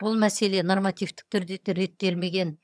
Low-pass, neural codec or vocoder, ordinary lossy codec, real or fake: none; vocoder, 22.05 kHz, 80 mel bands, HiFi-GAN; none; fake